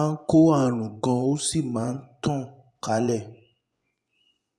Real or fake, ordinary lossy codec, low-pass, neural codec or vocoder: fake; none; none; vocoder, 24 kHz, 100 mel bands, Vocos